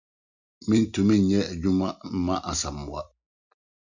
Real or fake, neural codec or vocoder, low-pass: real; none; 7.2 kHz